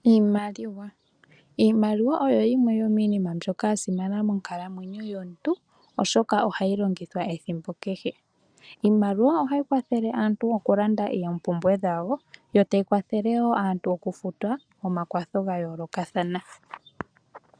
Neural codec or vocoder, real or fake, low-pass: none; real; 9.9 kHz